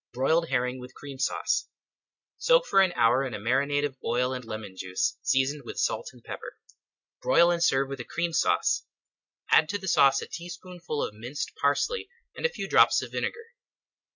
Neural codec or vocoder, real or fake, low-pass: none; real; 7.2 kHz